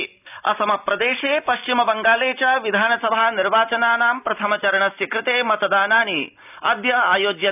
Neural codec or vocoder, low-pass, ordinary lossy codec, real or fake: none; 3.6 kHz; none; real